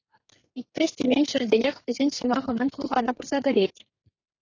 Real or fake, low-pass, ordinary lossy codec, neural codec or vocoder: fake; 7.2 kHz; AAC, 32 kbps; codec, 32 kHz, 1.9 kbps, SNAC